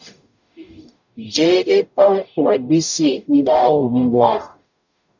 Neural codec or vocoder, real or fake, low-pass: codec, 44.1 kHz, 0.9 kbps, DAC; fake; 7.2 kHz